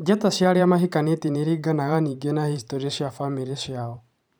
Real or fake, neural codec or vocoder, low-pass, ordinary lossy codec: fake; vocoder, 44.1 kHz, 128 mel bands every 512 samples, BigVGAN v2; none; none